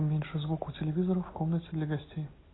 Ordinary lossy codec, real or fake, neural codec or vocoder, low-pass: AAC, 16 kbps; real; none; 7.2 kHz